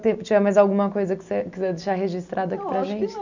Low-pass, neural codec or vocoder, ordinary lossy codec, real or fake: 7.2 kHz; none; none; real